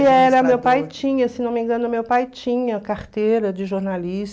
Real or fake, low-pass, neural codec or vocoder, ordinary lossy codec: real; none; none; none